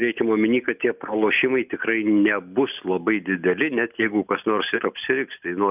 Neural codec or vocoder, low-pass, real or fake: none; 3.6 kHz; real